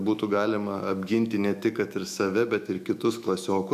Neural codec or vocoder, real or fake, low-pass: autoencoder, 48 kHz, 128 numbers a frame, DAC-VAE, trained on Japanese speech; fake; 14.4 kHz